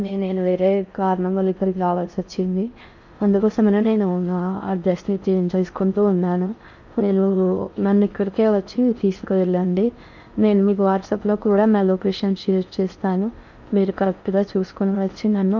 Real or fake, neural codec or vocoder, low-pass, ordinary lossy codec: fake; codec, 16 kHz in and 24 kHz out, 0.6 kbps, FocalCodec, streaming, 4096 codes; 7.2 kHz; none